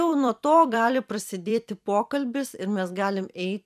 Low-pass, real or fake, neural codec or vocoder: 14.4 kHz; fake; vocoder, 44.1 kHz, 128 mel bands every 256 samples, BigVGAN v2